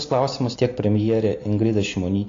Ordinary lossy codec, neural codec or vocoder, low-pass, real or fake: AAC, 32 kbps; none; 7.2 kHz; real